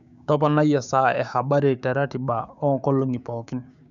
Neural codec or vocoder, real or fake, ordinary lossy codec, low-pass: codec, 16 kHz, 6 kbps, DAC; fake; none; 7.2 kHz